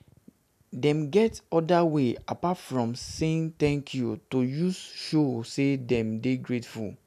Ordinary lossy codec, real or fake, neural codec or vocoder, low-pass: none; real; none; 14.4 kHz